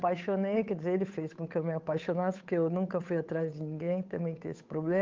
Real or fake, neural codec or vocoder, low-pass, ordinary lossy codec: fake; codec, 16 kHz, 8 kbps, FunCodec, trained on Chinese and English, 25 frames a second; 7.2 kHz; Opus, 32 kbps